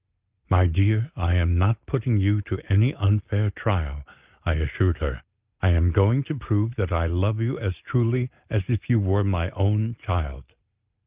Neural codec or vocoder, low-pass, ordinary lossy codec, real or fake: none; 3.6 kHz; Opus, 24 kbps; real